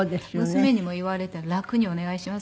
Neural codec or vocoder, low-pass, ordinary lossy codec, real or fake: none; none; none; real